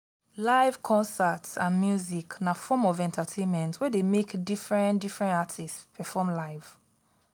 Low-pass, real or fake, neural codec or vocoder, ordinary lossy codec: none; real; none; none